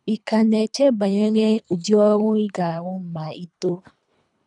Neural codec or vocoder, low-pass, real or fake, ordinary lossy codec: codec, 24 kHz, 3 kbps, HILCodec; 10.8 kHz; fake; none